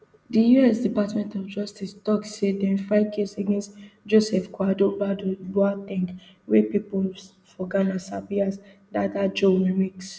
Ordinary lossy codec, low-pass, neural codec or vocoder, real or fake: none; none; none; real